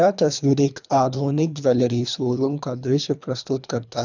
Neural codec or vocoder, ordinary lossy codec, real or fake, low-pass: codec, 24 kHz, 3 kbps, HILCodec; none; fake; 7.2 kHz